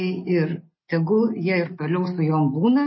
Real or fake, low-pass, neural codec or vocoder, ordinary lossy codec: real; 7.2 kHz; none; MP3, 24 kbps